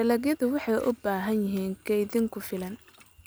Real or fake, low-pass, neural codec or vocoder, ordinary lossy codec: real; none; none; none